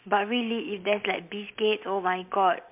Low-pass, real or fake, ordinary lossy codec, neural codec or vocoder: 3.6 kHz; real; MP3, 32 kbps; none